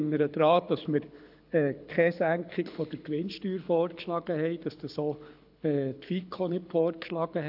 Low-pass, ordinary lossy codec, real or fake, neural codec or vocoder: 5.4 kHz; AAC, 48 kbps; fake; codec, 24 kHz, 6 kbps, HILCodec